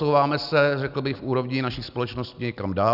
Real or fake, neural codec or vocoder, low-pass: real; none; 5.4 kHz